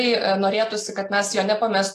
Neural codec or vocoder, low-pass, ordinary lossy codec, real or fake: none; 14.4 kHz; AAC, 48 kbps; real